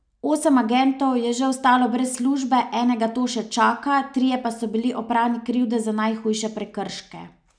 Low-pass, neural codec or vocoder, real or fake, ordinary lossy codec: 9.9 kHz; none; real; none